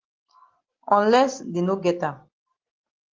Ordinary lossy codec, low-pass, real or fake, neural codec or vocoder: Opus, 16 kbps; 7.2 kHz; real; none